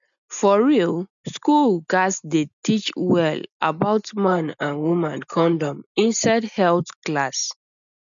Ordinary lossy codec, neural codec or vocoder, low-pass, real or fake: none; none; 7.2 kHz; real